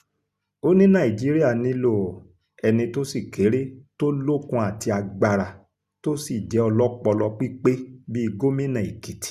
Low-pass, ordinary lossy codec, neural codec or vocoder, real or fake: 14.4 kHz; Opus, 64 kbps; none; real